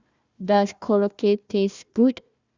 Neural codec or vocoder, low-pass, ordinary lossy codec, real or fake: codec, 16 kHz, 1 kbps, FunCodec, trained on Chinese and English, 50 frames a second; 7.2 kHz; Opus, 64 kbps; fake